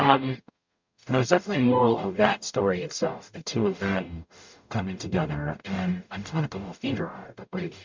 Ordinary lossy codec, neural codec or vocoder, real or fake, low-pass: MP3, 64 kbps; codec, 44.1 kHz, 0.9 kbps, DAC; fake; 7.2 kHz